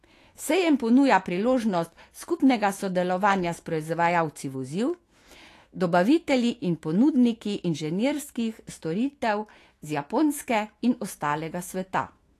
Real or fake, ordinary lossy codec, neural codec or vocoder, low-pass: fake; AAC, 48 kbps; autoencoder, 48 kHz, 128 numbers a frame, DAC-VAE, trained on Japanese speech; 14.4 kHz